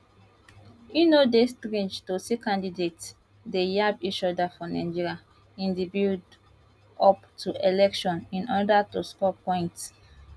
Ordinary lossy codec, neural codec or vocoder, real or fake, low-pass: none; none; real; none